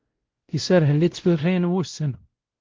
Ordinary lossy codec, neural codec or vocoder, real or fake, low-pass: Opus, 16 kbps; codec, 16 kHz, 0.5 kbps, X-Codec, WavLM features, trained on Multilingual LibriSpeech; fake; 7.2 kHz